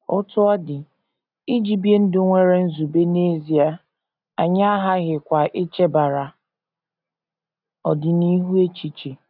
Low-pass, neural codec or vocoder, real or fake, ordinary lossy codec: 5.4 kHz; none; real; none